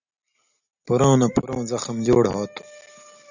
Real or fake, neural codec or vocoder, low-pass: real; none; 7.2 kHz